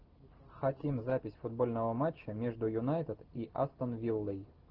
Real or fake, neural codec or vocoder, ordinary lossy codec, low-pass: real; none; Opus, 16 kbps; 5.4 kHz